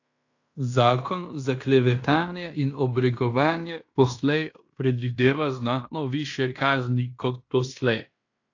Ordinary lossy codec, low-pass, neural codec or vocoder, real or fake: AAC, 48 kbps; 7.2 kHz; codec, 16 kHz in and 24 kHz out, 0.9 kbps, LongCat-Audio-Codec, fine tuned four codebook decoder; fake